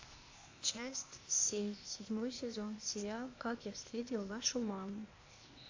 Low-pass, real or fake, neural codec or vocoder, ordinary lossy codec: 7.2 kHz; fake; codec, 16 kHz, 0.8 kbps, ZipCodec; AAC, 48 kbps